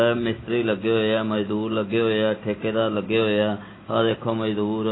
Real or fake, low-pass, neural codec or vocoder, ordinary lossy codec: real; 7.2 kHz; none; AAC, 16 kbps